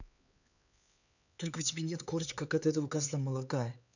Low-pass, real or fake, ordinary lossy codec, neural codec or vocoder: 7.2 kHz; fake; none; codec, 16 kHz, 4 kbps, X-Codec, HuBERT features, trained on LibriSpeech